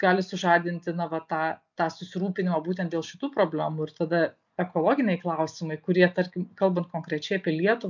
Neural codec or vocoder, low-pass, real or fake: none; 7.2 kHz; real